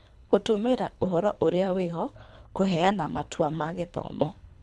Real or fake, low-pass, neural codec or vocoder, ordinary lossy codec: fake; none; codec, 24 kHz, 3 kbps, HILCodec; none